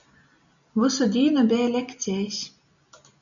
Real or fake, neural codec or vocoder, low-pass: real; none; 7.2 kHz